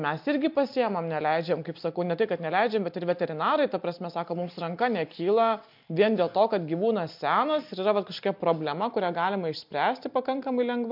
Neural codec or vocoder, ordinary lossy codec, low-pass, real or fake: none; MP3, 48 kbps; 5.4 kHz; real